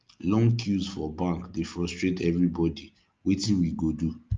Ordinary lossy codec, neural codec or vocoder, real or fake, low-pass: Opus, 24 kbps; none; real; 7.2 kHz